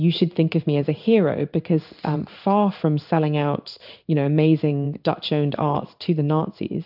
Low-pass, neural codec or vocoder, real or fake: 5.4 kHz; codec, 16 kHz in and 24 kHz out, 1 kbps, XY-Tokenizer; fake